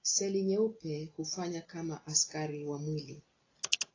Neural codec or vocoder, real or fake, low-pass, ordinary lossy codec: none; real; 7.2 kHz; AAC, 32 kbps